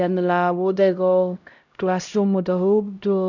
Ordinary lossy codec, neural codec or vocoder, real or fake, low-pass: none; codec, 16 kHz, 0.5 kbps, X-Codec, HuBERT features, trained on LibriSpeech; fake; 7.2 kHz